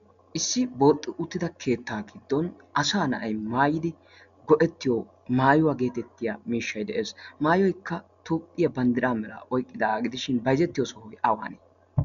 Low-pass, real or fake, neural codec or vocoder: 7.2 kHz; real; none